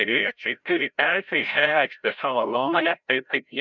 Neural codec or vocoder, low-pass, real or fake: codec, 16 kHz, 0.5 kbps, FreqCodec, larger model; 7.2 kHz; fake